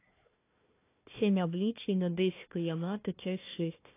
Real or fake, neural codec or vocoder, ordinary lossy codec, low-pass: fake; codec, 16 kHz, 1 kbps, FunCodec, trained on Chinese and English, 50 frames a second; AAC, 24 kbps; 3.6 kHz